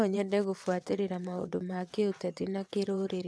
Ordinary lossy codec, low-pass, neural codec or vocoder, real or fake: none; none; vocoder, 22.05 kHz, 80 mel bands, WaveNeXt; fake